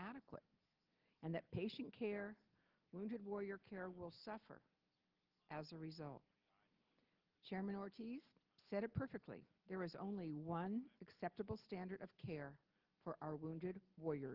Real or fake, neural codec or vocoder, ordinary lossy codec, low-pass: real; none; Opus, 16 kbps; 5.4 kHz